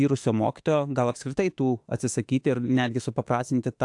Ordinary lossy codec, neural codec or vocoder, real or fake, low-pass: AAC, 64 kbps; autoencoder, 48 kHz, 32 numbers a frame, DAC-VAE, trained on Japanese speech; fake; 10.8 kHz